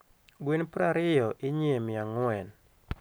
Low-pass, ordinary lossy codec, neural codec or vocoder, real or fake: none; none; none; real